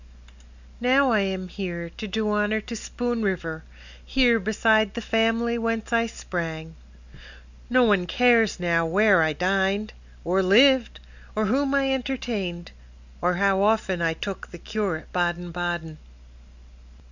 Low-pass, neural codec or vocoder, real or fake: 7.2 kHz; none; real